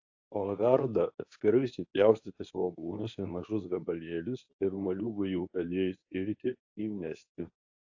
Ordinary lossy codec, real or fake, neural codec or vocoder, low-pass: AAC, 48 kbps; fake; codec, 24 kHz, 0.9 kbps, WavTokenizer, medium speech release version 2; 7.2 kHz